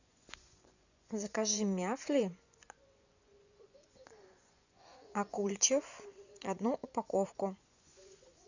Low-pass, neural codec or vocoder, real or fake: 7.2 kHz; vocoder, 24 kHz, 100 mel bands, Vocos; fake